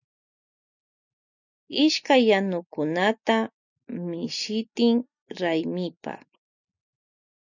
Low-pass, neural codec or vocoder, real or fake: 7.2 kHz; none; real